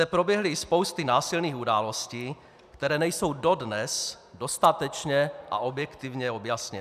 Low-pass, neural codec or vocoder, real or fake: 14.4 kHz; none; real